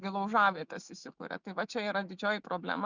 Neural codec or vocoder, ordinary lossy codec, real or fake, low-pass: autoencoder, 48 kHz, 128 numbers a frame, DAC-VAE, trained on Japanese speech; Opus, 64 kbps; fake; 7.2 kHz